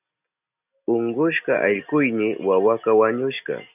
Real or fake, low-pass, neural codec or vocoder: real; 3.6 kHz; none